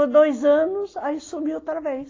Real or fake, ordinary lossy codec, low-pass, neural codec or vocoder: real; AAC, 32 kbps; 7.2 kHz; none